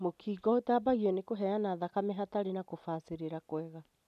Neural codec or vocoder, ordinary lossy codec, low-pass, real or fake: none; none; 14.4 kHz; real